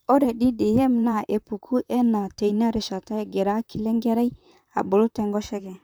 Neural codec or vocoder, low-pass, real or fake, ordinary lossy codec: vocoder, 44.1 kHz, 128 mel bands, Pupu-Vocoder; none; fake; none